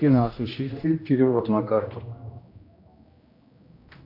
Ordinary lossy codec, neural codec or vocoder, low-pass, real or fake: AAC, 48 kbps; codec, 16 kHz, 1 kbps, X-Codec, HuBERT features, trained on general audio; 5.4 kHz; fake